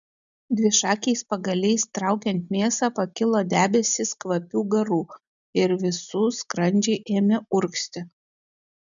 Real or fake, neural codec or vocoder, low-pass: real; none; 7.2 kHz